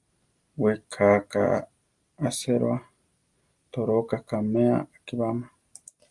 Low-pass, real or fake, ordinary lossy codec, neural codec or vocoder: 10.8 kHz; real; Opus, 32 kbps; none